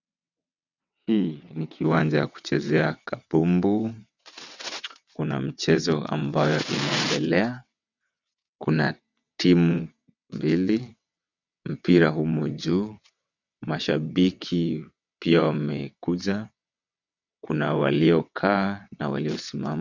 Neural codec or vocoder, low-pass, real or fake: vocoder, 22.05 kHz, 80 mel bands, WaveNeXt; 7.2 kHz; fake